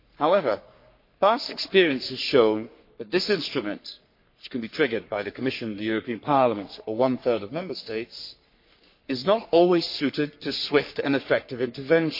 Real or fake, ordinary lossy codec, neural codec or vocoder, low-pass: fake; MP3, 32 kbps; codec, 44.1 kHz, 3.4 kbps, Pupu-Codec; 5.4 kHz